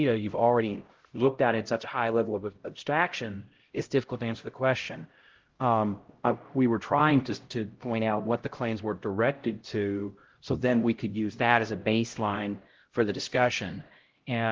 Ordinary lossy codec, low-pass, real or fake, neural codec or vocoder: Opus, 16 kbps; 7.2 kHz; fake; codec, 16 kHz, 0.5 kbps, X-Codec, HuBERT features, trained on LibriSpeech